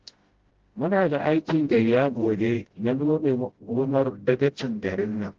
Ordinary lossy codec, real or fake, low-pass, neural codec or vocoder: Opus, 16 kbps; fake; 7.2 kHz; codec, 16 kHz, 0.5 kbps, FreqCodec, smaller model